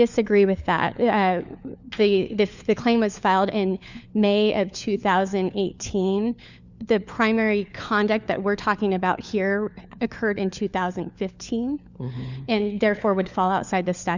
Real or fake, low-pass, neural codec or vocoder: fake; 7.2 kHz; codec, 16 kHz, 4 kbps, FunCodec, trained on LibriTTS, 50 frames a second